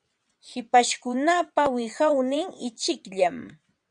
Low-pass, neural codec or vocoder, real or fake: 9.9 kHz; vocoder, 22.05 kHz, 80 mel bands, WaveNeXt; fake